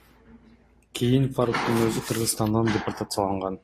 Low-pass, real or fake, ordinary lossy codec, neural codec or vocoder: 14.4 kHz; real; AAC, 48 kbps; none